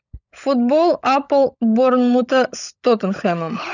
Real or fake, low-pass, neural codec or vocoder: fake; 7.2 kHz; codec, 16 kHz, 16 kbps, FunCodec, trained on LibriTTS, 50 frames a second